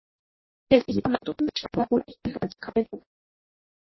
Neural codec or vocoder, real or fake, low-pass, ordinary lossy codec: codec, 16 kHz in and 24 kHz out, 0.6 kbps, FireRedTTS-2 codec; fake; 7.2 kHz; MP3, 24 kbps